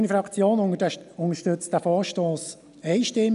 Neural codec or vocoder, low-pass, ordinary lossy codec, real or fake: none; 10.8 kHz; none; real